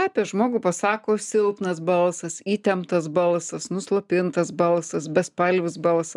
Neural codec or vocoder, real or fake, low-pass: none; real; 10.8 kHz